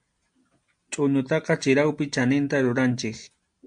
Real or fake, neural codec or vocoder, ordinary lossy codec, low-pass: real; none; MP3, 64 kbps; 9.9 kHz